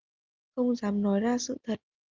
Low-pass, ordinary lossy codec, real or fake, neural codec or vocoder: 7.2 kHz; Opus, 32 kbps; real; none